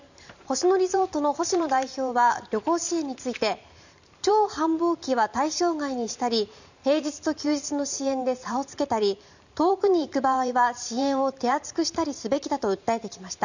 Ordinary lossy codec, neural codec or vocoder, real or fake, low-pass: none; vocoder, 44.1 kHz, 128 mel bands every 512 samples, BigVGAN v2; fake; 7.2 kHz